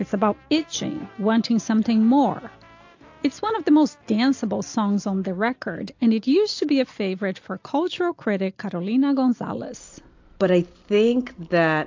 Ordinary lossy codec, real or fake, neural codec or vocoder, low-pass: AAC, 48 kbps; real; none; 7.2 kHz